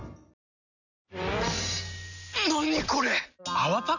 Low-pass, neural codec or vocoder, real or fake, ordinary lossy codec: 7.2 kHz; none; real; none